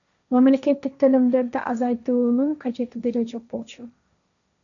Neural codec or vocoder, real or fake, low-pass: codec, 16 kHz, 1.1 kbps, Voila-Tokenizer; fake; 7.2 kHz